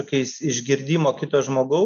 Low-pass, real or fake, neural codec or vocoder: 7.2 kHz; real; none